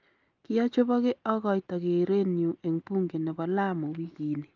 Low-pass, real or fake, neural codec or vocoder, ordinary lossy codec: 7.2 kHz; real; none; Opus, 24 kbps